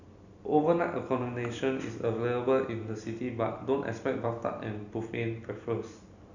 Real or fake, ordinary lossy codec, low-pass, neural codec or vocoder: real; none; 7.2 kHz; none